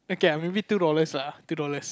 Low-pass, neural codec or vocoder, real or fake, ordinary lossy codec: none; none; real; none